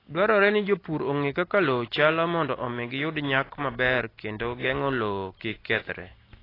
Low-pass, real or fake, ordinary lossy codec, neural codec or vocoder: 5.4 kHz; real; AAC, 24 kbps; none